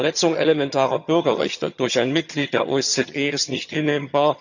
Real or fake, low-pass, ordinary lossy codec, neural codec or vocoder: fake; 7.2 kHz; none; vocoder, 22.05 kHz, 80 mel bands, HiFi-GAN